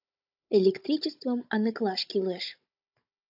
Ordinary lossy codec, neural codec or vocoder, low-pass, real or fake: AAC, 48 kbps; codec, 16 kHz, 16 kbps, FunCodec, trained on Chinese and English, 50 frames a second; 5.4 kHz; fake